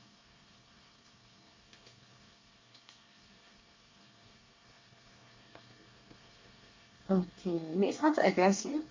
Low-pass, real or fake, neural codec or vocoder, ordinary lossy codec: 7.2 kHz; fake; codec, 24 kHz, 1 kbps, SNAC; MP3, 64 kbps